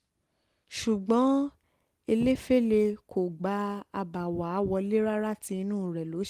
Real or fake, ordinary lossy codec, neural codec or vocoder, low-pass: real; Opus, 24 kbps; none; 14.4 kHz